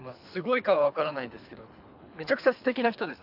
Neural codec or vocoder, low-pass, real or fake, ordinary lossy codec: codec, 24 kHz, 3 kbps, HILCodec; 5.4 kHz; fake; AAC, 48 kbps